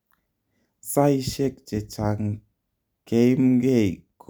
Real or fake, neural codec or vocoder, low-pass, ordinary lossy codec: real; none; none; none